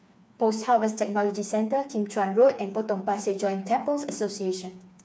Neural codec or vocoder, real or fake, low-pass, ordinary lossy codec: codec, 16 kHz, 4 kbps, FreqCodec, smaller model; fake; none; none